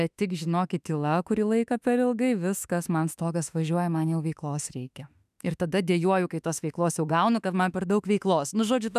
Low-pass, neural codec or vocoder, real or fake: 14.4 kHz; autoencoder, 48 kHz, 32 numbers a frame, DAC-VAE, trained on Japanese speech; fake